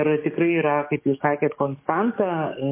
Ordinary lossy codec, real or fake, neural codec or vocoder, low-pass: MP3, 24 kbps; fake; codec, 44.1 kHz, 7.8 kbps, DAC; 3.6 kHz